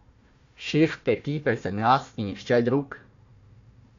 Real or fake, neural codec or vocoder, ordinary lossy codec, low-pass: fake; codec, 16 kHz, 1 kbps, FunCodec, trained on Chinese and English, 50 frames a second; MP3, 64 kbps; 7.2 kHz